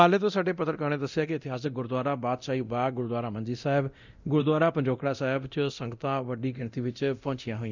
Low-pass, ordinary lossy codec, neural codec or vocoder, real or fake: 7.2 kHz; none; codec, 24 kHz, 0.9 kbps, DualCodec; fake